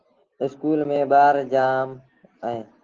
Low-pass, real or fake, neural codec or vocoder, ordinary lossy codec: 7.2 kHz; real; none; Opus, 16 kbps